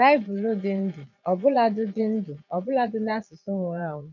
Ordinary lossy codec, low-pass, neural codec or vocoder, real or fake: none; 7.2 kHz; vocoder, 22.05 kHz, 80 mel bands, Vocos; fake